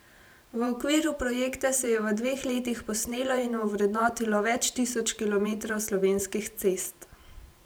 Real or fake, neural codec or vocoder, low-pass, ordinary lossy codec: fake; vocoder, 44.1 kHz, 128 mel bands every 512 samples, BigVGAN v2; none; none